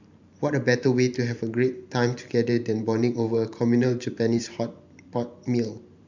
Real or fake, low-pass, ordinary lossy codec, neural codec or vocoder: fake; 7.2 kHz; MP3, 64 kbps; vocoder, 44.1 kHz, 128 mel bands every 512 samples, BigVGAN v2